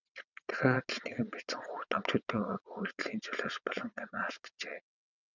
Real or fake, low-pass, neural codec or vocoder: fake; 7.2 kHz; vocoder, 22.05 kHz, 80 mel bands, WaveNeXt